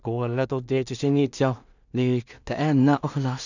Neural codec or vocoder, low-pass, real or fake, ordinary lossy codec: codec, 16 kHz in and 24 kHz out, 0.4 kbps, LongCat-Audio-Codec, two codebook decoder; 7.2 kHz; fake; none